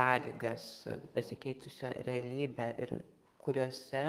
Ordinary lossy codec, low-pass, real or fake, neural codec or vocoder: Opus, 32 kbps; 14.4 kHz; fake; codec, 32 kHz, 1.9 kbps, SNAC